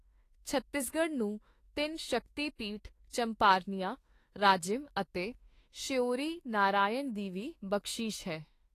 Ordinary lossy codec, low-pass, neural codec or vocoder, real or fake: AAC, 48 kbps; 14.4 kHz; autoencoder, 48 kHz, 32 numbers a frame, DAC-VAE, trained on Japanese speech; fake